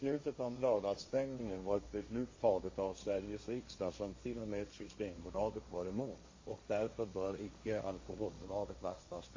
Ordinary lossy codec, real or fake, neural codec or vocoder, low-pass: MP3, 32 kbps; fake; codec, 16 kHz, 1.1 kbps, Voila-Tokenizer; 7.2 kHz